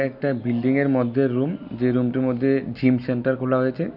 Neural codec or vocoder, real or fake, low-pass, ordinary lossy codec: none; real; 5.4 kHz; none